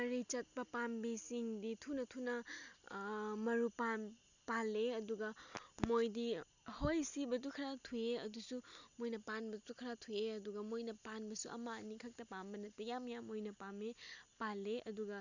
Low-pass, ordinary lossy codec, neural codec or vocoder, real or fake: 7.2 kHz; none; none; real